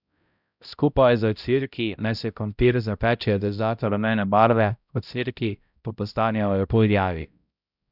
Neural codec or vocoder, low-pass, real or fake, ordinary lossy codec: codec, 16 kHz, 0.5 kbps, X-Codec, HuBERT features, trained on balanced general audio; 5.4 kHz; fake; none